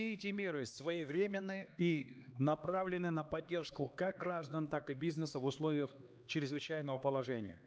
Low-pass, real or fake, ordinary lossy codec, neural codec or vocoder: none; fake; none; codec, 16 kHz, 2 kbps, X-Codec, HuBERT features, trained on LibriSpeech